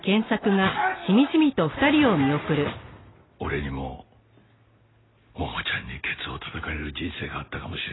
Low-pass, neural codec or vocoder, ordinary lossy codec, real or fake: 7.2 kHz; none; AAC, 16 kbps; real